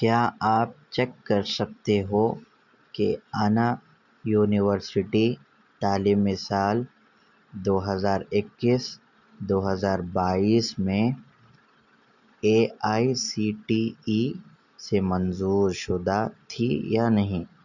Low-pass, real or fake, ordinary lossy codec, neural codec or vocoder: 7.2 kHz; real; none; none